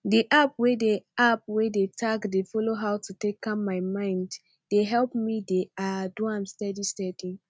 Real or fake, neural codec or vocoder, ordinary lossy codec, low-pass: real; none; none; none